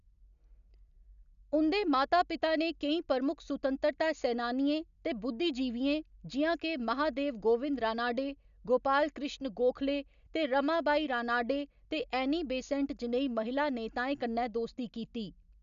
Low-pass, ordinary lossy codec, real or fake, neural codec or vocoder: 7.2 kHz; none; real; none